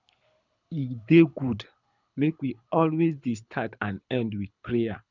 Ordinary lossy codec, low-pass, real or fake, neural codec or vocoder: none; 7.2 kHz; fake; codec, 24 kHz, 6 kbps, HILCodec